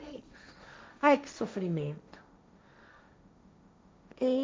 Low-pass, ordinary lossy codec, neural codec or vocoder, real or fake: none; none; codec, 16 kHz, 1.1 kbps, Voila-Tokenizer; fake